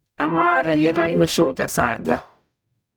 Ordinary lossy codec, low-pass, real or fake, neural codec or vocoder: none; none; fake; codec, 44.1 kHz, 0.9 kbps, DAC